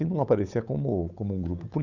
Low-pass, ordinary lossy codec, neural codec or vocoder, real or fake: 7.2 kHz; none; codec, 16 kHz, 8 kbps, FunCodec, trained on Chinese and English, 25 frames a second; fake